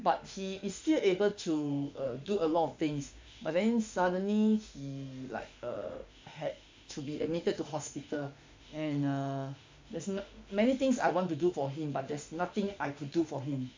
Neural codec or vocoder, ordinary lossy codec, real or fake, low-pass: autoencoder, 48 kHz, 32 numbers a frame, DAC-VAE, trained on Japanese speech; MP3, 64 kbps; fake; 7.2 kHz